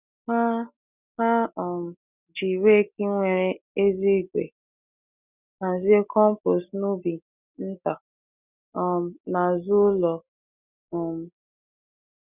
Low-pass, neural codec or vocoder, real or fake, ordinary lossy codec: 3.6 kHz; none; real; none